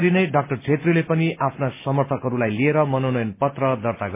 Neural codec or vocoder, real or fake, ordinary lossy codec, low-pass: none; real; MP3, 24 kbps; 3.6 kHz